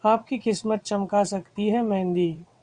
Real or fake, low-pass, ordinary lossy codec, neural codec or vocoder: fake; 9.9 kHz; Opus, 64 kbps; vocoder, 22.05 kHz, 80 mel bands, WaveNeXt